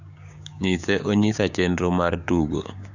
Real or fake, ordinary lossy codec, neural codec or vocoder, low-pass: fake; none; codec, 16 kHz, 6 kbps, DAC; 7.2 kHz